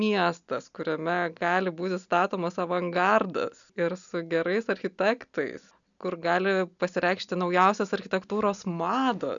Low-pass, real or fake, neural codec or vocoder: 7.2 kHz; real; none